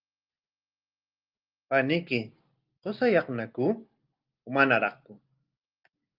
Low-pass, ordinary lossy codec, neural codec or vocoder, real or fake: 5.4 kHz; Opus, 24 kbps; none; real